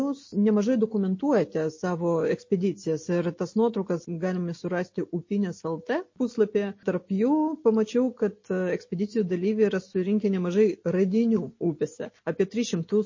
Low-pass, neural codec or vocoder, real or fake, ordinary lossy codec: 7.2 kHz; none; real; MP3, 32 kbps